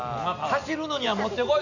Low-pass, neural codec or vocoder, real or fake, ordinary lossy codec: 7.2 kHz; none; real; none